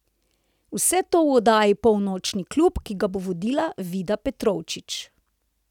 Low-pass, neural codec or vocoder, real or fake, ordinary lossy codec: 19.8 kHz; none; real; none